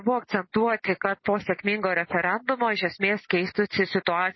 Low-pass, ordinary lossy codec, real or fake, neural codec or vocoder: 7.2 kHz; MP3, 24 kbps; real; none